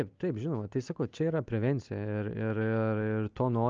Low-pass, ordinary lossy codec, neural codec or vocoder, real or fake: 7.2 kHz; Opus, 24 kbps; none; real